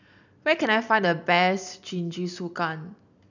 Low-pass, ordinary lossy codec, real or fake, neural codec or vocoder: 7.2 kHz; none; real; none